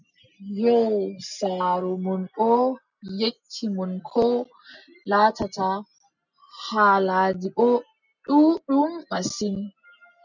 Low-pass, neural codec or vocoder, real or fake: 7.2 kHz; none; real